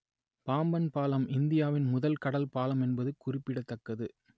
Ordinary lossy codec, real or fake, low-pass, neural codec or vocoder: none; real; none; none